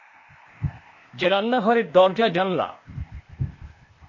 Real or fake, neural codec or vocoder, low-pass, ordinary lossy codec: fake; codec, 16 kHz, 0.8 kbps, ZipCodec; 7.2 kHz; MP3, 32 kbps